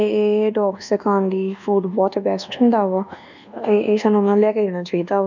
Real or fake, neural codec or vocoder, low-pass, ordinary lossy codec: fake; codec, 24 kHz, 1.2 kbps, DualCodec; 7.2 kHz; none